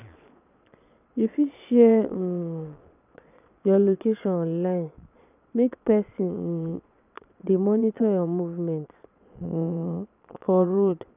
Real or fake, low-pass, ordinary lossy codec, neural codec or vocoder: fake; 3.6 kHz; none; vocoder, 22.05 kHz, 80 mel bands, Vocos